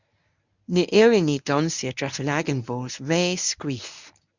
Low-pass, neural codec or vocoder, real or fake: 7.2 kHz; codec, 24 kHz, 0.9 kbps, WavTokenizer, medium speech release version 1; fake